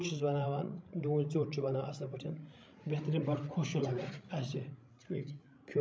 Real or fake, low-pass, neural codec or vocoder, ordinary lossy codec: fake; none; codec, 16 kHz, 16 kbps, FreqCodec, larger model; none